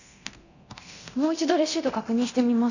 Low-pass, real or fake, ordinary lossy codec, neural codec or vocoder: 7.2 kHz; fake; none; codec, 24 kHz, 0.9 kbps, DualCodec